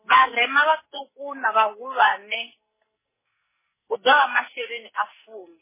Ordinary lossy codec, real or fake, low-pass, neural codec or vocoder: MP3, 16 kbps; fake; 3.6 kHz; codec, 44.1 kHz, 2.6 kbps, SNAC